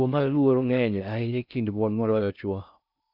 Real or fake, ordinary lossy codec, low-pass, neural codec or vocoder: fake; none; 5.4 kHz; codec, 16 kHz in and 24 kHz out, 0.6 kbps, FocalCodec, streaming, 2048 codes